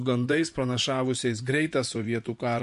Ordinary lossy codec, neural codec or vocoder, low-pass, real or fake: MP3, 64 kbps; vocoder, 24 kHz, 100 mel bands, Vocos; 10.8 kHz; fake